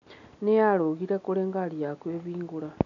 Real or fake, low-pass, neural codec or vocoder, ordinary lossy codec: real; 7.2 kHz; none; none